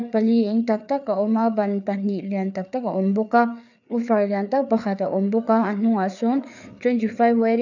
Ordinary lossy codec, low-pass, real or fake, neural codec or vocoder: none; 7.2 kHz; fake; codec, 16 kHz, 4 kbps, FreqCodec, larger model